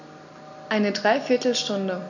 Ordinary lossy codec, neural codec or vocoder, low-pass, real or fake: none; none; 7.2 kHz; real